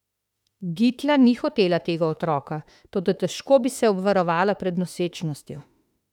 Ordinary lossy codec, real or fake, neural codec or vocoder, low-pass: none; fake; autoencoder, 48 kHz, 32 numbers a frame, DAC-VAE, trained on Japanese speech; 19.8 kHz